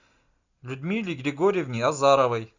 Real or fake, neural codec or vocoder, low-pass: real; none; 7.2 kHz